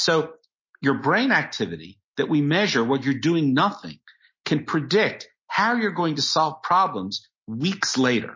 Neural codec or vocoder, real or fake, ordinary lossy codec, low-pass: none; real; MP3, 32 kbps; 7.2 kHz